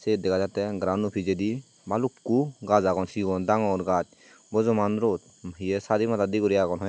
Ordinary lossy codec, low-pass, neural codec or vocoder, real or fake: none; none; none; real